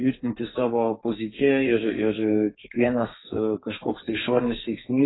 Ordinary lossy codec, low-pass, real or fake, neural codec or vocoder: AAC, 16 kbps; 7.2 kHz; fake; codec, 32 kHz, 1.9 kbps, SNAC